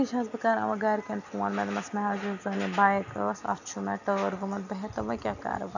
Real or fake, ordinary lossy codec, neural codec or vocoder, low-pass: real; none; none; 7.2 kHz